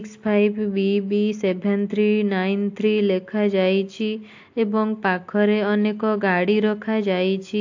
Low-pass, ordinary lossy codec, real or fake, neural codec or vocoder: 7.2 kHz; AAC, 48 kbps; real; none